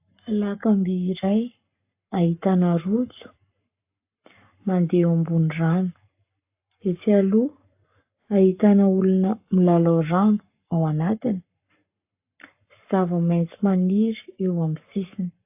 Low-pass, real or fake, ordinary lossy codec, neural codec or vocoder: 3.6 kHz; fake; AAC, 32 kbps; codec, 44.1 kHz, 7.8 kbps, Pupu-Codec